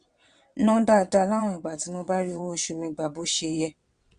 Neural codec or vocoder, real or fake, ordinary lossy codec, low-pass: vocoder, 22.05 kHz, 80 mel bands, WaveNeXt; fake; Opus, 64 kbps; 9.9 kHz